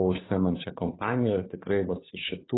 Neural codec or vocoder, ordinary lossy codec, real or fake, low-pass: codec, 16 kHz, 2 kbps, FunCodec, trained on Chinese and English, 25 frames a second; AAC, 16 kbps; fake; 7.2 kHz